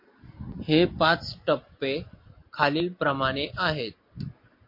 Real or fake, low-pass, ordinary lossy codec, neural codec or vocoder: real; 5.4 kHz; MP3, 32 kbps; none